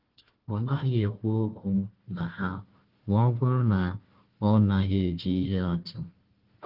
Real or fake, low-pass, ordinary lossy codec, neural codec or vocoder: fake; 5.4 kHz; Opus, 16 kbps; codec, 16 kHz, 1 kbps, FunCodec, trained on Chinese and English, 50 frames a second